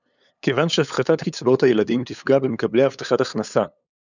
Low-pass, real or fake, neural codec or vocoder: 7.2 kHz; fake; codec, 16 kHz, 8 kbps, FunCodec, trained on LibriTTS, 25 frames a second